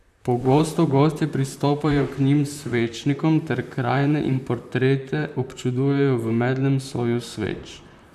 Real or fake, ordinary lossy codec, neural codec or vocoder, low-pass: fake; none; vocoder, 44.1 kHz, 128 mel bands, Pupu-Vocoder; 14.4 kHz